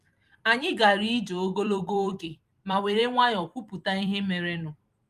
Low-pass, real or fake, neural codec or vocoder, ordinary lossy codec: 14.4 kHz; fake; vocoder, 44.1 kHz, 128 mel bands every 256 samples, BigVGAN v2; Opus, 32 kbps